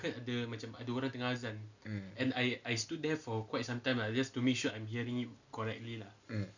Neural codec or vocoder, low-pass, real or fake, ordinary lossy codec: none; 7.2 kHz; real; none